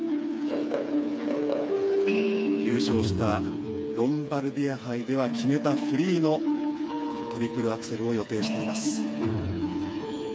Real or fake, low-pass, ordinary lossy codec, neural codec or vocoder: fake; none; none; codec, 16 kHz, 4 kbps, FreqCodec, smaller model